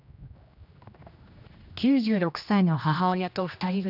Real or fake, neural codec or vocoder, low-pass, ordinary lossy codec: fake; codec, 16 kHz, 1 kbps, X-Codec, HuBERT features, trained on general audio; 5.4 kHz; none